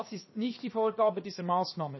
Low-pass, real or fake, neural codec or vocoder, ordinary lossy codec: 7.2 kHz; fake; codec, 16 kHz, 0.7 kbps, FocalCodec; MP3, 24 kbps